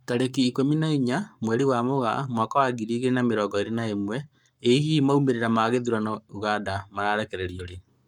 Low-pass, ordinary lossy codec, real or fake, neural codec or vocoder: 19.8 kHz; none; fake; codec, 44.1 kHz, 7.8 kbps, Pupu-Codec